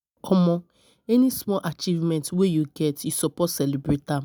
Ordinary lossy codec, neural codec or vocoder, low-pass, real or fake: none; none; none; real